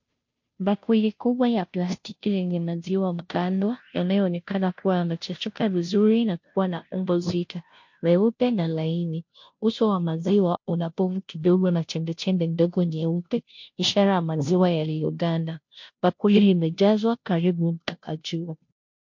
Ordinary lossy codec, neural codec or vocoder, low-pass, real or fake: MP3, 48 kbps; codec, 16 kHz, 0.5 kbps, FunCodec, trained on Chinese and English, 25 frames a second; 7.2 kHz; fake